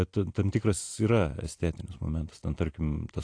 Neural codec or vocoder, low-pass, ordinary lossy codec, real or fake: none; 9.9 kHz; AAC, 48 kbps; real